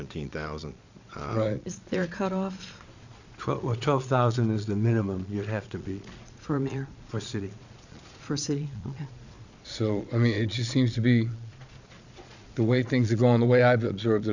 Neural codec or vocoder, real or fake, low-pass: vocoder, 22.05 kHz, 80 mel bands, Vocos; fake; 7.2 kHz